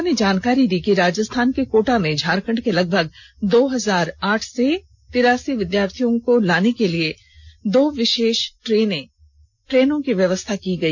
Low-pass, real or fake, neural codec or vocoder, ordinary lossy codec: 7.2 kHz; real; none; none